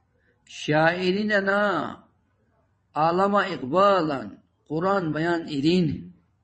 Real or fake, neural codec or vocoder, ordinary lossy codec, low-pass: fake; vocoder, 22.05 kHz, 80 mel bands, WaveNeXt; MP3, 32 kbps; 9.9 kHz